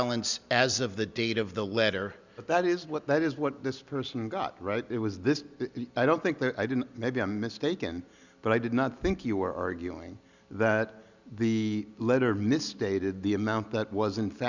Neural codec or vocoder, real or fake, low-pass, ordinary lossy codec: none; real; 7.2 kHz; Opus, 64 kbps